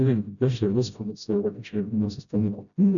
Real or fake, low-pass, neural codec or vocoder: fake; 7.2 kHz; codec, 16 kHz, 0.5 kbps, FreqCodec, smaller model